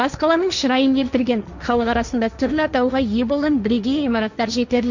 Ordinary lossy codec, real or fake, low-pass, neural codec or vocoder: none; fake; 7.2 kHz; codec, 16 kHz, 1.1 kbps, Voila-Tokenizer